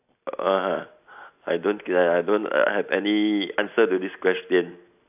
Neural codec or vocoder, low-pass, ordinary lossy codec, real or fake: none; 3.6 kHz; AAC, 32 kbps; real